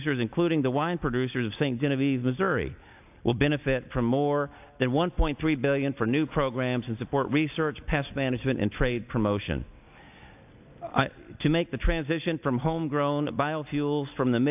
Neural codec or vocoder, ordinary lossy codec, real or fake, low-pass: none; AAC, 32 kbps; real; 3.6 kHz